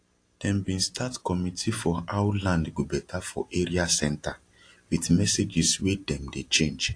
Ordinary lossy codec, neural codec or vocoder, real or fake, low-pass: AAC, 48 kbps; none; real; 9.9 kHz